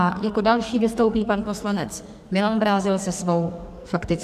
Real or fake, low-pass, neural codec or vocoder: fake; 14.4 kHz; codec, 44.1 kHz, 2.6 kbps, SNAC